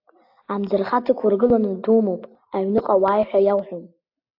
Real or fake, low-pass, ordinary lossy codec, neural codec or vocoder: real; 5.4 kHz; AAC, 48 kbps; none